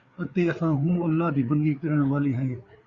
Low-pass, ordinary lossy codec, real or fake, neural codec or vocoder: 7.2 kHz; Opus, 64 kbps; fake; codec, 16 kHz, 4 kbps, FreqCodec, larger model